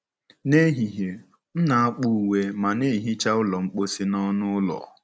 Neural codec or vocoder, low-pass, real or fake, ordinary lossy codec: none; none; real; none